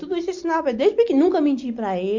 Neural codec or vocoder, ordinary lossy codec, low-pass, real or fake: none; MP3, 48 kbps; 7.2 kHz; real